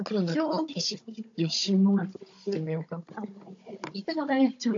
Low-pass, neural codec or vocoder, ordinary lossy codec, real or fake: 7.2 kHz; codec, 16 kHz, 16 kbps, FunCodec, trained on Chinese and English, 50 frames a second; AAC, 48 kbps; fake